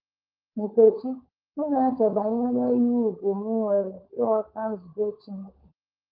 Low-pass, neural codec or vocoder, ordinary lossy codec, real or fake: 5.4 kHz; codec, 16 kHz, 16 kbps, FunCodec, trained on LibriTTS, 50 frames a second; Opus, 32 kbps; fake